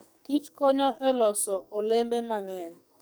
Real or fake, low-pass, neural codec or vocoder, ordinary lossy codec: fake; none; codec, 44.1 kHz, 2.6 kbps, SNAC; none